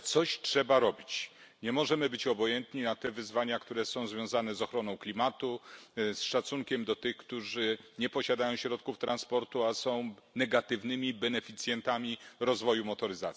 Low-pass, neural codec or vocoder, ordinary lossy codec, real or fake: none; none; none; real